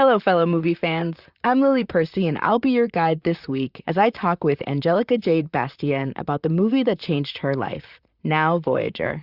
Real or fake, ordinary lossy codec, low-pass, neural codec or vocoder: fake; Opus, 64 kbps; 5.4 kHz; vocoder, 44.1 kHz, 128 mel bands, Pupu-Vocoder